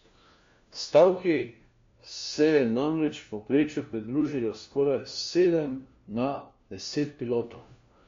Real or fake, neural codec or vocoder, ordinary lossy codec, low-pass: fake; codec, 16 kHz, 1 kbps, FunCodec, trained on LibriTTS, 50 frames a second; MP3, 48 kbps; 7.2 kHz